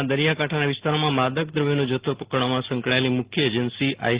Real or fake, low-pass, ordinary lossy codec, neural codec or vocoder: real; 3.6 kHz; Opus, 16 kbps; none